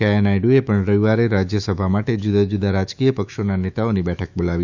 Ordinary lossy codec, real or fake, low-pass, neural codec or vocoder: none; fake; 7.2 kHz; autoencoder, 48 kHz, 128 numbers a frame, DAC-VAE, trained on Japanese speech